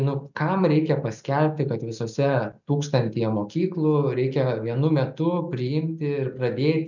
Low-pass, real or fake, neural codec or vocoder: 7.2 kHz; real; none